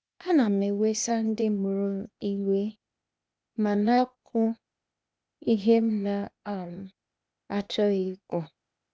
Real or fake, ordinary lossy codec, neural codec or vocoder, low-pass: fake; none; codec, 16 kHz, 0.8 kbps, ZipCodec; none